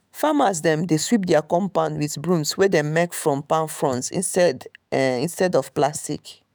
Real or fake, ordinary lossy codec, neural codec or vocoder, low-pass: fake; none; autoencoder, 48 kHz, 128 numbers a frame, DAC-VAE, trained on Japanese speech; none